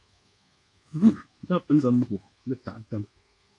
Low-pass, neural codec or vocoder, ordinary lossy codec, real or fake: 10.8 kHz; codec, 24 kHz, 1.2 kbps, DualCodec; AAC, 48 kbps; fake